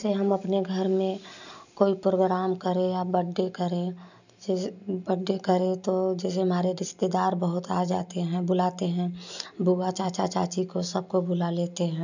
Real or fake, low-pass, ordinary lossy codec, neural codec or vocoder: real; 7.2 kHz; none; none